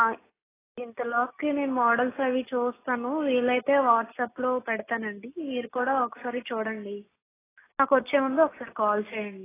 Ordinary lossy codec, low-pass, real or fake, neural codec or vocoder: AAC, 16 kbps; 3.6 kHz; real; none